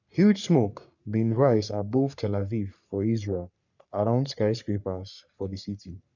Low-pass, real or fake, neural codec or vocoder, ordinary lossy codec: 7.2 kHz; fake; codec, 44.1 kHz, 3.4 kbps, Pupu-Codec; none